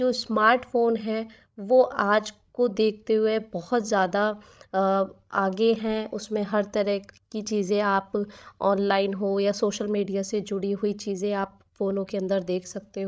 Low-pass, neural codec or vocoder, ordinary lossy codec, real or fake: none; codec, 16 kHz, 16 kbps, FreqCodec, larger model; none; fake